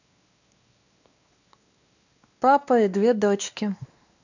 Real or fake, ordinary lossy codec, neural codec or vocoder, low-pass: fake; none; codec, 16 kHz, 2 kbps, X-Codec, WavLM features, trained on Multilingual LibriSpeech; 7.2 kHz